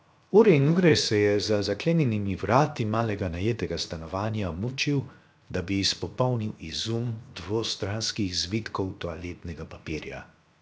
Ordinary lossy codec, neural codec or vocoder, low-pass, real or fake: none; codec, 16 kHz, 0.7 kbps, FocalCodec; none; fake